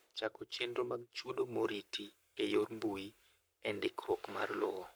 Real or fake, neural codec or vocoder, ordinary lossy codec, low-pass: fake; codec, 44.1 kHz, 7.8 kbps, Pupu-Codec; none; none